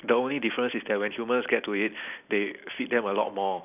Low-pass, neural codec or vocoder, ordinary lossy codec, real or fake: 3.6 kHz; none; none; real